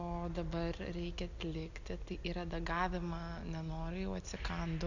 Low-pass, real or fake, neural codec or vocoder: 7.2 kHz; real; none